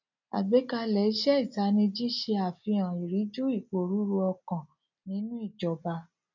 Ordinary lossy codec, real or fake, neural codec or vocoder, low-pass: none; real; none; 7.2 kHz